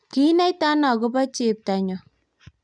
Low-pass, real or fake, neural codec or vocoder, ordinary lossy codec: 9.9 kHz; real; none; none